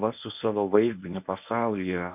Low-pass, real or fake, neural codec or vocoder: 3.6 kHz; fake; codec, 24 kHz, 0.9 kbps, WavTokenizer, medium speech release version 1